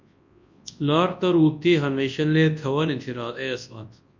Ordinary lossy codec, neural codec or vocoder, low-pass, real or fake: MP3, 48 kbps; codec, 24 kHz, 0.9 kbps, WavTokenizer, large speech release; 7.2 kHz; fake